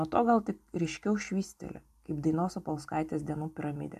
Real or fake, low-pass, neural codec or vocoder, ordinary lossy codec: real; 14.4 kHz; none; AAC, 96 kbps